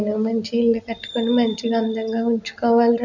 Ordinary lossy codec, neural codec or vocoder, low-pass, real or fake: none; none; 7.2 kHz; real